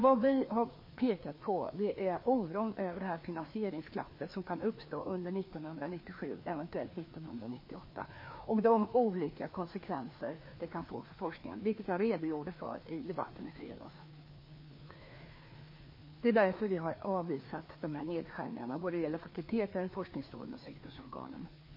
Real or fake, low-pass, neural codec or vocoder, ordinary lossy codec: fake; 5.4 kHz; codec, 16 kHz, 2 kbps, FreqCodec, larger model; MP3, 24 kbps